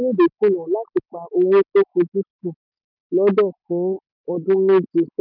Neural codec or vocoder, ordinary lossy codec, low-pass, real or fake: none; none; 5.4 kHz; real